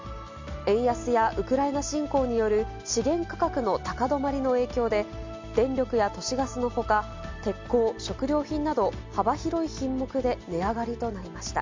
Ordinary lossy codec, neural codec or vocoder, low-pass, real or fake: MP3, 64 kbps; none; 7.2 kHz; real